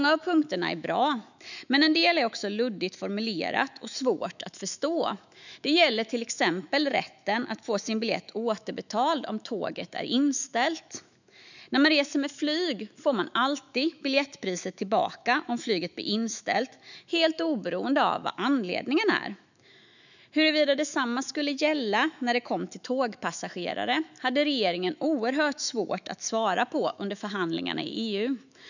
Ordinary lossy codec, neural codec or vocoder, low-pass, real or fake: none; autoencoder, 48 kHz, 128 numbers a frame, DAC-VAE, trained on Japanese speech; 7.2 kHz; fake